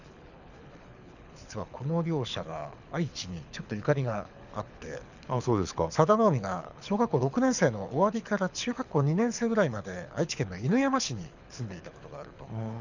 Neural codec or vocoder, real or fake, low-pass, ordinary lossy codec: codec, 24 kHz, 6 kbps, HILCodec; fake; 7.2 kHz; none